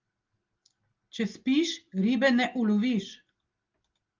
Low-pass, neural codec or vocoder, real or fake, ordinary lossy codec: 7.2 kHz; none; real; Opus, 32 kbps